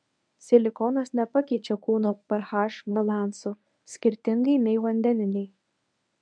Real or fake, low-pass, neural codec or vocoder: fake; 9.9 kHz; codec, 24 kHz, 0.9 kbps, WavTokenizer, medium speech release version 1